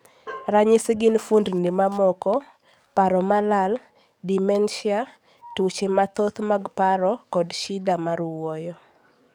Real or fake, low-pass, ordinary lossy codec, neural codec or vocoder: fake; 19.8 kHz; none; codec, 44.1 kHz, 7.8 kbps, DAC